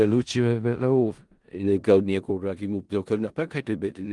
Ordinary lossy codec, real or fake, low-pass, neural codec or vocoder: Opus, 24 kbps; fake; 10.8 kHz; codec, 16 kHz in and 24 kHz out, 0.4 kbps, LongCat-Audio-Codec, four codebook decoder